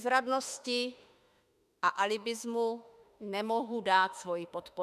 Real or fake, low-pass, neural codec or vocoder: fake; 14.4 kHz; autoencoder, 48 kHz, 32 numbers a frame, DAC-VAE, trained on Japanese speech